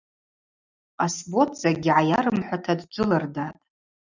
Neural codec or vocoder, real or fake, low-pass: none; real; 7.2 kHz